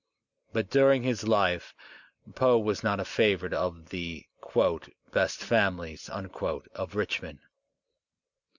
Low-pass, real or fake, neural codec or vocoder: 7.2 kHz; real; none